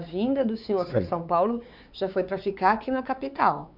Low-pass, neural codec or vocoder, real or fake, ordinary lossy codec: 5.4 kHz; codec, 16 kHz, 4 kbps, X-Codec, WavLM features, trained on Multilingual LibriSpeech; fake; none